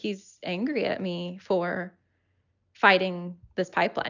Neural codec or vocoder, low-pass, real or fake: none; 7.2 kHz; real